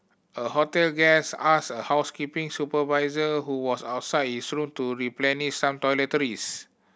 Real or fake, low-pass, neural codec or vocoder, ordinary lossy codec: real; none; none; none